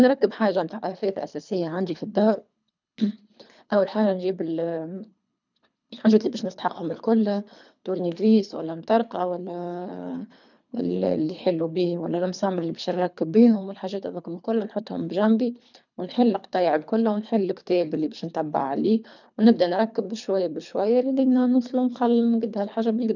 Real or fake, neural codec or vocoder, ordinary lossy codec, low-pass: fake; codec, 24 kHz, 3 kbps, HILCodec; none; 7.2 kHz